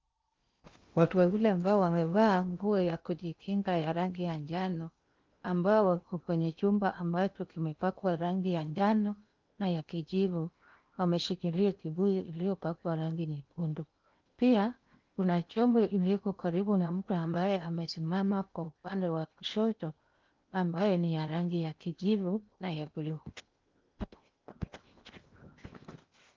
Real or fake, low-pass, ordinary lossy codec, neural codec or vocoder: fake; 7.2 kHz; Opus, 24 kbps; codec, 16 kHz in and 24 kHz out, 0.8 kbps, FocalCodec, streaming, 65536 codes